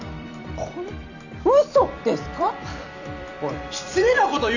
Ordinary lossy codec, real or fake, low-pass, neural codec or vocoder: none; fake; 7.2 kHz; vocoder, 44.1 kHz, 128 mel bands every 256 samples, BigVGAN v2